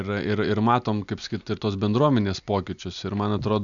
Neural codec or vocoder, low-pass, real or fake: none; 7.2 kHz; real